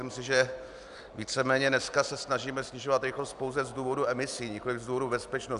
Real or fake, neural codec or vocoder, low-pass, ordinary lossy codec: real; none; 10.8 kHz; AAC, 96 kbps